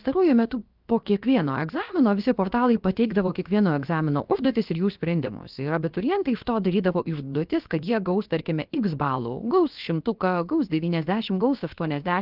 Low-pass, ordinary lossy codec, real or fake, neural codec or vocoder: 5.4 kHz; Opus, 32 kbps; fake; codec, 16 kHz, about 1 kbps, DyCAST, with the encoder's durations